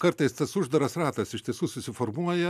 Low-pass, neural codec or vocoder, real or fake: 14.4 kHz; none; real